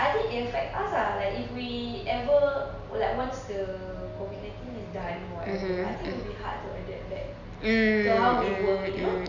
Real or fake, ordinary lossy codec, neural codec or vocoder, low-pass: real; none; none; 7.2 kHz